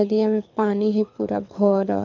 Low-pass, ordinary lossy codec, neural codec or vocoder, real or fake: 7.2 kHz; none; codec, 24 kHz, 6 kbps, HILCodec; fake